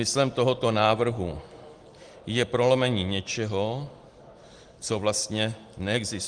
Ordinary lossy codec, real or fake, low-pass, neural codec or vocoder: Opus, 24 kbps; real; 9.9 kHz; none